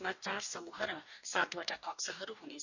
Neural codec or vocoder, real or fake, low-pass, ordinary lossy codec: codec, 44.1 kHz, 2.6 kbps, DAC; fake; 7.2 kHz; none